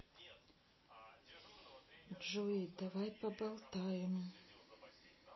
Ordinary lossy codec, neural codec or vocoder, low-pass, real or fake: MP3, 24 kbps; none; 7.2 kHz; real